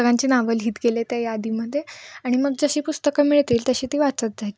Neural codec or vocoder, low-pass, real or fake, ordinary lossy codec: none; none; real; none